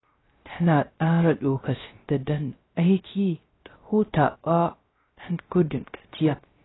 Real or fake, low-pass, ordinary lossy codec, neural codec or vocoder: fake; 7.2 kHz; AAC, 16 kbps; codec, 16 kHz, 0.3 kbps, FocalCodec